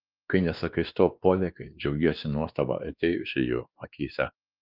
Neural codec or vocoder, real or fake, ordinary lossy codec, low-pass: codec, 16 kHz, 2 kbps, X-Codec, WavLM features, trained on Multilingual LibriSpeech; fake; Opus, 24 kbps; 5.4 kHz